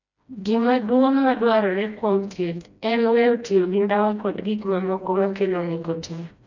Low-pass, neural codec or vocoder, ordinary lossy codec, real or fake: 7.2 kHz; codec, 16 kHz, 1 kbps, FreqCodec, smaller model; none; fake